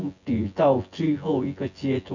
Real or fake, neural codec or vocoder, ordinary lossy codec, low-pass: fake; vocoder, 24 kHz, 100 mel bands, Vocos; none; 7.2 kHz